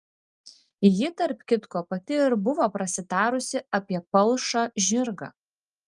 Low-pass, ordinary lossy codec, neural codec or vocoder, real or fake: 10.8 kHz; Opus, 32 kbps; none; real